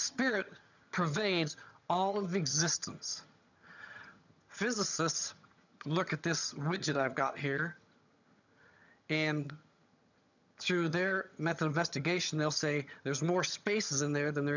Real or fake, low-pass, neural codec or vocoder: fake; 7.2 kHz; vocoder, 22.05 kHz, 80 mel bands, HiFi-GAN